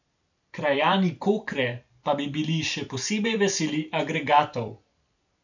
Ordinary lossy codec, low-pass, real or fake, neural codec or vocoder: none; 7.2 kHz; fake; vocoder, 44.1 kHz, 128 mel bands every 256 samples, BigVGAN v2